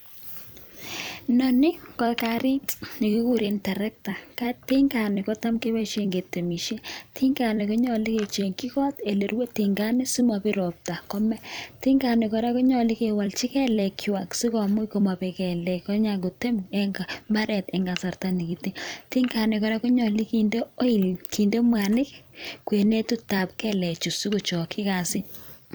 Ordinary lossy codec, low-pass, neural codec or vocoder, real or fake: none; none; vocoder, 44.1 kHz, 128 mel bands every 256 samples, BigVGAN v2; fake